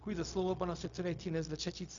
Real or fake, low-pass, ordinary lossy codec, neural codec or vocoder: fake; 7.2 kHz; MP3, 64 kbps; codec, 16 kHz, 0.4 kbps, LongCat-Audio-Codec